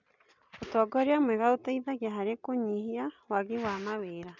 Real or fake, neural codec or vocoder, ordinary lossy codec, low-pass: real; none; none; 7.2 kHz